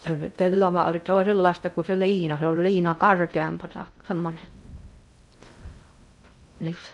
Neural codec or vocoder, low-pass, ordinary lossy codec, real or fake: codec, 16 kHz in and 24 kHz out, 0.6 kbps, FocalCodec, streaming, 2048 codes; 10.8 kHz; none; fake